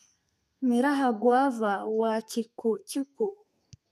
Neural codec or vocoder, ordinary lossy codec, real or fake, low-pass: codec, 32 kHz, 1.9 kbps, SNAC; none; fake; 14.4 kHz